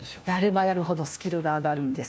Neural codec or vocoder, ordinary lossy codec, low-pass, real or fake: codec, 16 kHz, 1 kbps, FunCodec, trained on LibriTTS, 50 frames a second; none; none; fake